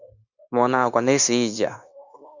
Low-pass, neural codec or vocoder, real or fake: 7.2 kHz; codec, 16 kHz, 0.9 kbps, LongCat-Audio-Codec; fake